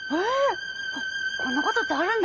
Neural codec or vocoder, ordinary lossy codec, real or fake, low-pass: none; Opus, 24 kbps; real; 7.2 kHz